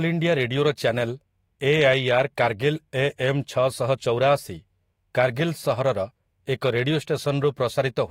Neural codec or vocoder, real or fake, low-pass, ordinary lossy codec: autoencoder, 48 kHz, 128 numbers a frame, DAC-VAE, trained on Japanese speech; fake; 19.8 kHz; AAC, 48 kbps